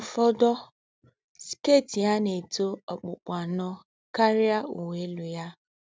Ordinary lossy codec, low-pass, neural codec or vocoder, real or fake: none; none; none; real